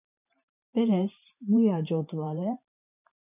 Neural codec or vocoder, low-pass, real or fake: vocoder, 44.1 kHz, 128 mel bands every 512 samples, BigVGAN v2; 3.6 kHz; fake